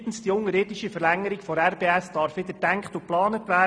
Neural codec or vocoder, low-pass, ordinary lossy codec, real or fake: none; none; none; real